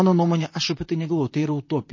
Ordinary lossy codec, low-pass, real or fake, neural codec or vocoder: MP3, 32 kbps; 7.2 kHz; fake; vocoder, 44.1 kHz, 128 mel bands, Pupu-Vocoder